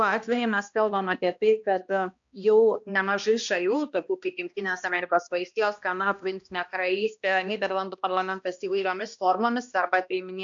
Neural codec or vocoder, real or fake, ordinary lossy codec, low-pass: codec, 16 kHz, 1 kbps, X-Codec, HuBERT features, trained on balanced general audio; fake; AAC, 64 kbps; 7.2 kHz